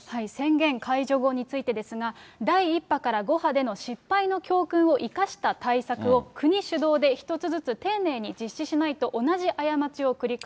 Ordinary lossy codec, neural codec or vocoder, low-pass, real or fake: none; none; none; real